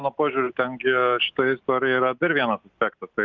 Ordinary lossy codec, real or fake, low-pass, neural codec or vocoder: Opus, 24 kbps; real; 7.2 kHz; none